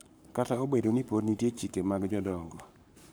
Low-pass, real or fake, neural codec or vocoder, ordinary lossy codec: none; fake; codec, 44.1 kHz, 7.8 kbps, Pupu-Codec; none